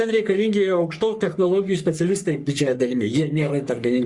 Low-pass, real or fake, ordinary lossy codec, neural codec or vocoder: 10.8 kHz; fake; Opus, 64 kbps; codec, 44.1 kHz, 3.4 kbps, Pupu-Codec